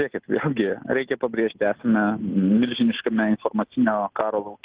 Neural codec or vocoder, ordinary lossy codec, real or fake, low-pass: none; Opus, 16 kbps; real; 3.6 kHz